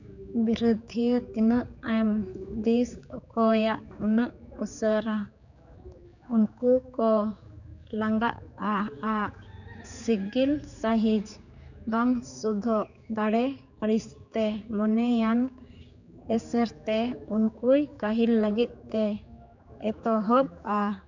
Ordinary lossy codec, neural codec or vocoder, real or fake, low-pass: none; codec, 16 kHz, 4 kbps, X-Codec, HuBERT features, trained on general audio; fake; 7.2 kHz